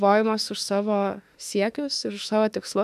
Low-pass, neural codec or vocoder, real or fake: 14.4 kHz; autoencoder, 48 kHz, 32 numbers a frame, DAC-VAE, trained on Japanese speech; fake